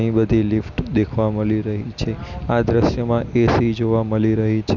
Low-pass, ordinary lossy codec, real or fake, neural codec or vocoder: 7.2 kHz; none; real; none